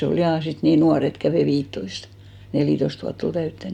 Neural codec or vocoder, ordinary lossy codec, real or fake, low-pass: none; none; real; 19.8 kHz